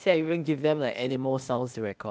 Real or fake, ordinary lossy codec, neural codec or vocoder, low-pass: fake; none; codec, 16 kHz, 0.8 kbps, ZipCodec; none